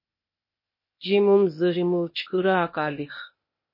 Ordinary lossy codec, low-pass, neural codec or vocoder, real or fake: MP3, 24 kbps; 5.4 kHz; codec, 16 kHz, 0.8 kbps, ZipCodec; fake